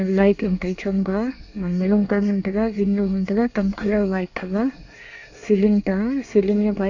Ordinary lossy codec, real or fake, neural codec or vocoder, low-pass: AAC, 48 kbps; fake; codec, 24 kHz, 1 kbps, SNAC; 7.2 kHz